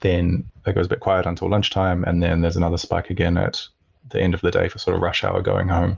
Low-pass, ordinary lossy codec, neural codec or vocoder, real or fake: 7.2 kHz; Opus, 24 kbps; none; real